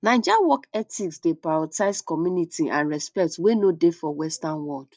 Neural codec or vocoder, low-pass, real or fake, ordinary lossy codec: none; none; real; none